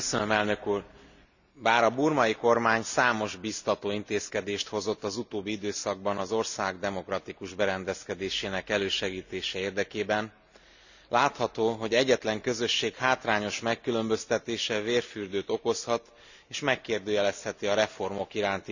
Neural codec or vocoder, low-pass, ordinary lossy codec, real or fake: none; 7.2 kHz; none; real